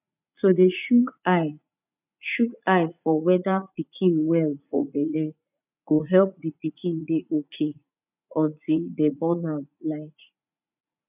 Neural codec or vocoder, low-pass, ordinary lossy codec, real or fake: vocoder, 44.1 kHz, 80 mel bands, Vocos; 3.6 kHz; none; fake